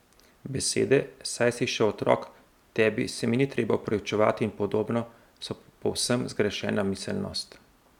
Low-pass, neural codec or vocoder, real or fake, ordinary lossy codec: 19.8 kHz; none; real; none